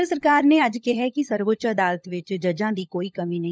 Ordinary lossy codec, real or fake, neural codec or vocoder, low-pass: none; fake; codec, 16 kHz, 16 kbps, FunCodec, trained on LibriTTS, 50 frames a second; none